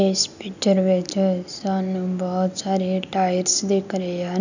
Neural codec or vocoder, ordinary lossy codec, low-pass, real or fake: none; none; 7.2 kHz; real